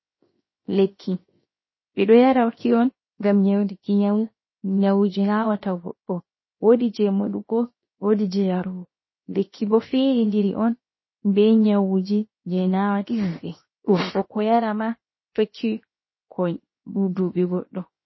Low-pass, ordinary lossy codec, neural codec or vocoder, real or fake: 7.2 kHz; MP3, 24 kbps; codec, 16 kHz, 0.7 kbps, FocalCodec; fake